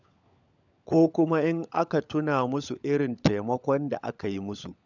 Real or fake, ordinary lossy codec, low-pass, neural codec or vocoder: fake; none; 7.2 kHz; codec, 16 kHz, 16 kbps, FunCodec, trained on LibriTTS, 50 frames a second